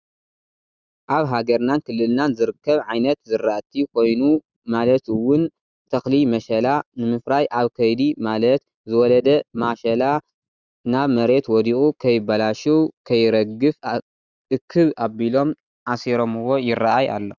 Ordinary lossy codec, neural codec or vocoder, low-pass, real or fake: Opus, 64 kbps; none; 7.2 kHz; real